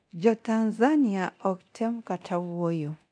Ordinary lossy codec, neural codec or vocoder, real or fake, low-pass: none; codec, 24 kHz, 0.9 kbps, DualCodec; fake; 9.9 kHz